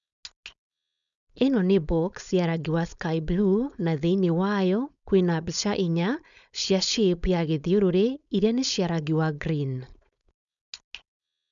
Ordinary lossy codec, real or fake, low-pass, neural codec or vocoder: none; fake; 7.2 kHz; codec, 16 kHz, 4.8 kbps, FACodec